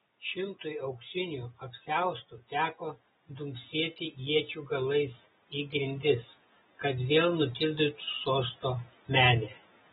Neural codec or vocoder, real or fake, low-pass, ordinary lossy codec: none; real; 7.2 kHz; AAC, 16 kbps